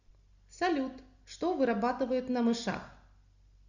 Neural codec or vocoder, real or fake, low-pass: none; real; 7.2 kHz